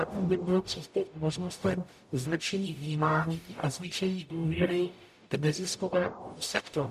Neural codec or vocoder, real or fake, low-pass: codec, 44.1 kHz, 0.9 kbps, DAC; fake; 14.4 kHz